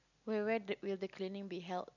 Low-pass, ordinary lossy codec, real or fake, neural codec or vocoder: 7.2 kHz; none; real; none